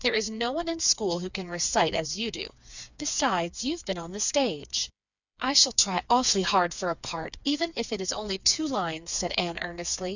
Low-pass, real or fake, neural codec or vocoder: 7.2 kHz; fake; codec, 16 kHz, 4 kbps, FreqCodec, smaller model